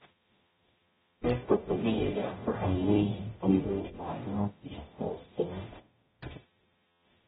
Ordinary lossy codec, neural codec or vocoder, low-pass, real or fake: AAC, 16 kbps; codec, 44.1 kHz, 0.9 kbps, DAC; 19.8 kHz; fake